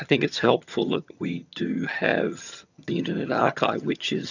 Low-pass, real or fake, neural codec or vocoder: 7.2 kHz; fake; vocoder, 22.05 kHz, 80 mel bands, HiFi-GAN